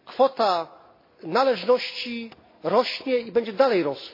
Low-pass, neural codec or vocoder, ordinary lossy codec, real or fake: 5.4 kHz; none; MP3, 24 kbps; real